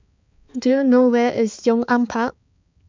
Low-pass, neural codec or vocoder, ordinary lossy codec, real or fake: 7.2 kHz; codec, 16 kHz, 4 kbps, X-Codec, HuBERT features, trained on balanced general audio; MP3, 64 kbps; fake